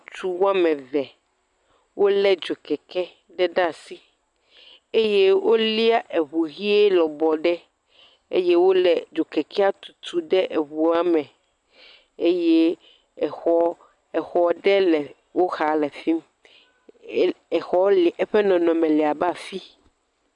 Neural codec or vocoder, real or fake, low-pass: none; real; 10.8 kHz